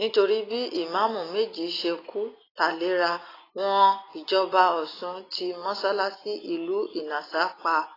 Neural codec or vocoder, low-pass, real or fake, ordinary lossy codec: none; 5.4 kHz; real; AAC, 24 kbps